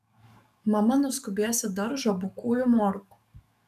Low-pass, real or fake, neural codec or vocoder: 14.4 kHz; fake; codec, 44.1 kHz, 7.8 kbps, DAC